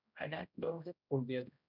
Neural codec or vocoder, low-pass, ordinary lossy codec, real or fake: codec, 16 kHz, 0.5 kbps, X-Codec, HuBERT features, trained on general audio; 5.4 kHz; none; fake